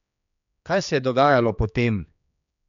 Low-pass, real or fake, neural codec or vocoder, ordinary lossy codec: 7.2 kHz; fake; codec, 16 kHz, 2 kbps, X-Codec, HuBERT features, trained on general audio; none